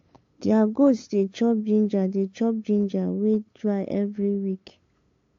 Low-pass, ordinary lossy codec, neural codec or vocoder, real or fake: 7.2 kHz; AAC, 48 kbps; codec, 16 kHz, 8 kbps, FunCodec, trained on LibriTTS, 25 frames a second; fake